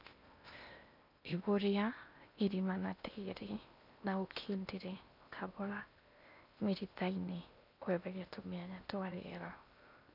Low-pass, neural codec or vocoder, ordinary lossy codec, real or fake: 5.4 kHz; codec, 16 kHz in and 24 kHz out, 0.8 kbps, FocalCodec, streaming, 65536 codes; none; fake